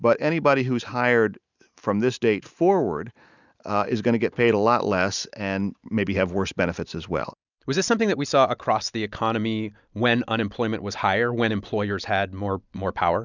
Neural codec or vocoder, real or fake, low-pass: none; real; 7.2 kHz